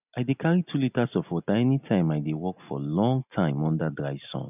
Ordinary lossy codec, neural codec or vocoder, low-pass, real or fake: none; none; 3.6 kHz; real